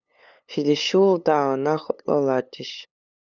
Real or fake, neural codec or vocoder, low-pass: fake; codec, 16 kHz, 8 kbps, FunCodec, trained on LibriTTS, 25 frames a second; 7.2 kHz